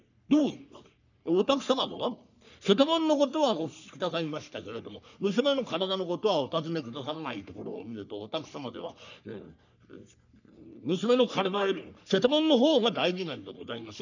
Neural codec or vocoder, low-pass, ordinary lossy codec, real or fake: codec, 44.1 kHz, 3.4 kbps, Pupu-Codec; 7.2 kHz; none; fake